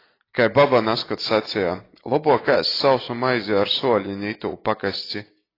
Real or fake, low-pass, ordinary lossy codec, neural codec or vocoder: real; 5.4 kHz; AAC, 24 kbps; none